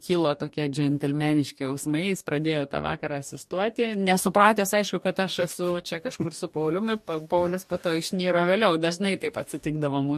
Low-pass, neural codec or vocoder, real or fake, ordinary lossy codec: 14.4 kHz; codec, 44.1 kHz, 2.6 kbps, DAC; fake; MP3, 64 kbps